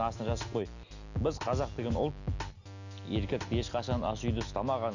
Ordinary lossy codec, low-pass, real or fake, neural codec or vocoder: none; 7.2 kHz; real; none